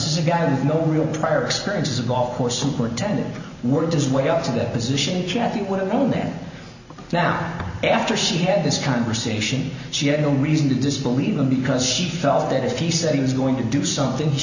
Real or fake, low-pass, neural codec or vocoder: real; 7.2 kHz; none